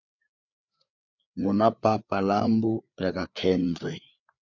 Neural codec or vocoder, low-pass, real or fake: codec, 16 kHz, 4 kbps, FreqCodec, larger model; 7.2 kHz; fake